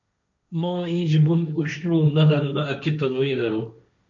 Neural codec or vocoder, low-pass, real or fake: codec, 16 kHz, 1.1 kbps, Voila-Tokenizer; 7.2 kHz; fake